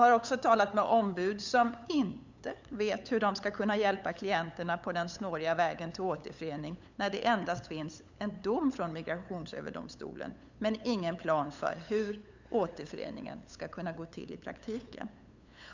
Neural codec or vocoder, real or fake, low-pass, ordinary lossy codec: codec, 16 kHz, 8 kbps, FunCodec, trained on LibriTTS, 25 frames a second; fake; 7.2 kHz; Opus, 64 kbps